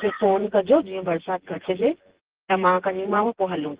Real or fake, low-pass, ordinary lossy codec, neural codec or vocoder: fake; 3.6 kHz; Opus, 16 kbps; vocoder, 24 kHz, 100 mel bands, Vocos